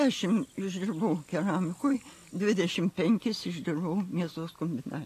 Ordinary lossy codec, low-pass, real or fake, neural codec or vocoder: AAC, 48 kbps; 14.4 kHz; real; none